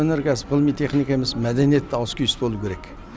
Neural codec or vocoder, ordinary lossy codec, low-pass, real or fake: none; none; none; real